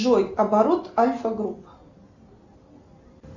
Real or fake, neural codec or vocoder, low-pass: real; none; 7.2 kHz